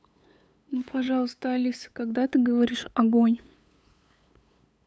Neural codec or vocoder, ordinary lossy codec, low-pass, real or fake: codec, 16 kHz, 8 kbps, FunCodec, trained on LibriTTS, 25 frames a second; none; none; fake